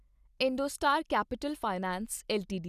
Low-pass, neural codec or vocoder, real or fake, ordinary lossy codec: 14.4 kHz; none; real; Opus, 64 kbps